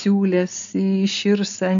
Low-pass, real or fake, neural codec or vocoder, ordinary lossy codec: 7.2 kHz; real; none; MP3, 64 kbps